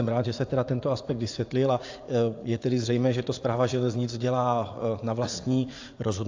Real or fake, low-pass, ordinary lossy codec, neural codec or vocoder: fake; 7.2 kHz; AAC, 48 kbps; vocoder, 44.1 kHz, 80 mel bands, Vocos